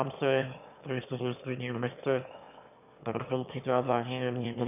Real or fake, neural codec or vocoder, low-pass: fake; autoencoder, 22.05 kHz, a latent of 192 numbers a frame, VITS, trained on one speaker; 3.6 kHz